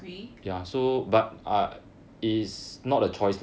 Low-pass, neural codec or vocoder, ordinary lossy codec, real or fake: none; none; none; real